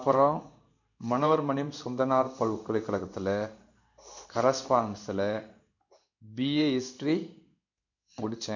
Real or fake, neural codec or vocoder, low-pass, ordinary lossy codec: fake; codec, 16 kHz in and 24 kHz out, 1 kbps, XY-Tokenizer; 7.2 kHz; none